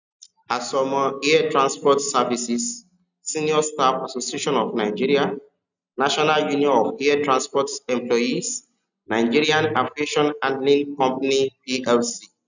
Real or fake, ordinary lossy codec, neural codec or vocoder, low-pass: real; none; none; 7.2 kHz